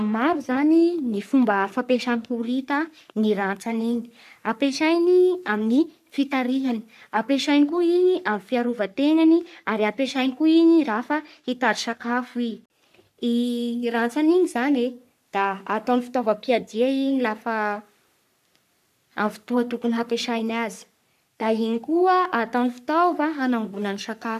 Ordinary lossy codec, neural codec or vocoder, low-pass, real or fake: none; codec, 44.1 kHz, 3.4 kbps, Pupu-Codec; 14.4 kHz; fake